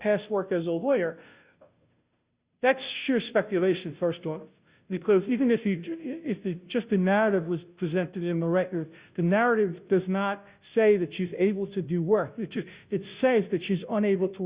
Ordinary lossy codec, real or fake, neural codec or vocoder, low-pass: Opus, 64 kbps; fake; codec, 16 kHz, 0.5 kbps, FunCodec, trained on Chinese and English, 25 frames a second; 3.6 kHz